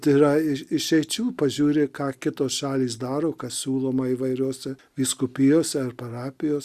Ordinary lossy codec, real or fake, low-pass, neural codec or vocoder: Opus, 64 kbps; real; 14.4 kHz; none